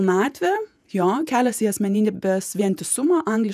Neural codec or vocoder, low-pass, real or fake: vocoder, 48 kHz, 128 mel bands, Vocos; 14.4 kHz; fake